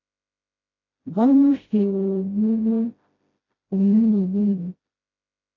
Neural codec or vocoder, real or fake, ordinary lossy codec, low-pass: codec, 16 kHz, 0.5 kbps, FreqCodec, smaller model; fake; AAC, 32 kbps; 7.2 kHz